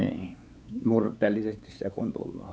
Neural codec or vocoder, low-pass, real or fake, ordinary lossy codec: codec, 16 kHz, 4 kbps, X-Codec, WavLM features, trained on Multilingual LibriSpeech; none; fake; none